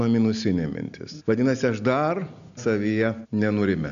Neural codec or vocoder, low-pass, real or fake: none; 7.2 kHz; real